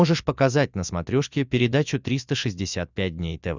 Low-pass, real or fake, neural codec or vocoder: 7.2 kHz; real; none